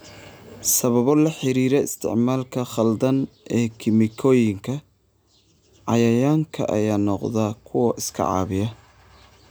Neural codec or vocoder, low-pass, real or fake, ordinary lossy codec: none; none; real; none